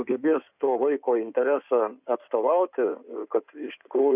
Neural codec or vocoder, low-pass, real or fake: codec, 16 kHz in and 24 kHz out, 2.2 kbps, FireRedTTS-2 codec; 3.6 kHz; fake